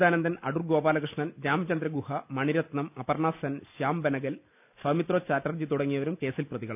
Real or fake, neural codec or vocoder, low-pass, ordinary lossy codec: real; none; 3.6 kHz; none